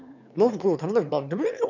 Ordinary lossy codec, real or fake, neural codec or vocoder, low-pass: none; fake; autoencoder, 22.05 kHz, a latent of 192 numbers a frame, VITS, trained on one speaker; 7.2 kHz